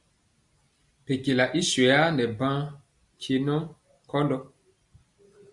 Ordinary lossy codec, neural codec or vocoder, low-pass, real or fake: Opus, 64 kbps; none; 10.8 kHz; real